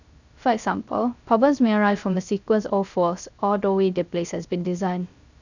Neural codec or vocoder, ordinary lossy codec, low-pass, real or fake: codec, 16 kHz, 0.3 kbps, FocalCodec; none; 7.2 kHz; fake